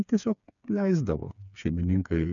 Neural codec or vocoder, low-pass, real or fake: codec, 16 kHz, 4 kbps, FreqCodec, smaller model; 7.2 kHz; fake